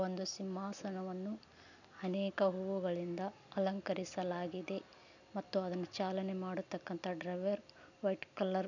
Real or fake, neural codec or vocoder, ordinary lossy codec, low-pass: real; none; none; 7.2 kHz